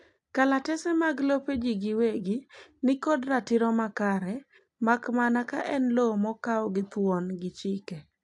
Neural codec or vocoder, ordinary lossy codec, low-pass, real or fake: none; AAC, 64 kbps; 10.8 kHz; real